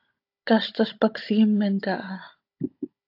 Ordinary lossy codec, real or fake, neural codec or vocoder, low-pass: AAC, 32 kbps; fake; codec, 16 kHz, 16 kbps, FunCodec, trained on Chinese and English, 50 frames a second; 5.4 kHz